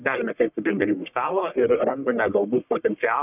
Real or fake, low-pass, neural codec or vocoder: fake; 3.6 kHz; codec, 44.1 kHz, 1.7 kbps, Pupu-Codec